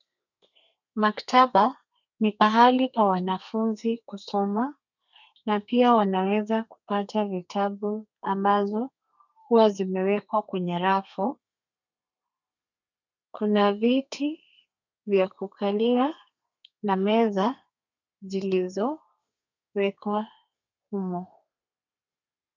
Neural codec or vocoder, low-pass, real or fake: codec, 32 kHz, 1.9 kbps, SNAC; 7.2 kHz; fake